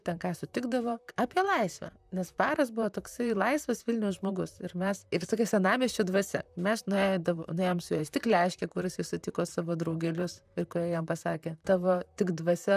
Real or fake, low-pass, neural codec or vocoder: fake; 14.4 kHz; vocoder, 44.1 kHz, 128 mel bands, Pupu-Vocoder